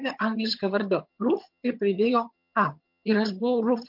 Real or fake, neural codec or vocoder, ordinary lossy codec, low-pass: fake; vocoder, 22.05 kHz, 80 mel bands, HiFi-GAN; MP3, 48 kbps; 5.4 kHz